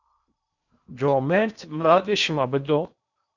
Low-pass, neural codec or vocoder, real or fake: 7.2 kHz; codec, 16 kHz in and 24 kHz out, 0.8 kbps, FocalCodec, streaming, 65536 codes; fake